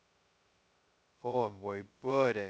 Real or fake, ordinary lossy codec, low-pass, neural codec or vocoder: fake; none; none; codec, 16 kHz, 0.2 kbps, FocalCodec